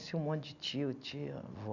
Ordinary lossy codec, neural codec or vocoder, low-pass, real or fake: none; none; 7.2 kHz; real